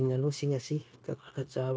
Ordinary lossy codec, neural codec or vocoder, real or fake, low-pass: none; codec, 16 kHz, 0.9 kbps, LongCat-Audio-Codec; fake; none